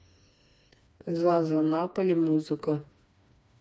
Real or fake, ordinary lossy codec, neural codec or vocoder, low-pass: fake; none; codec, 16 kHz, 2 kbps, FreqCodec, smaller model; none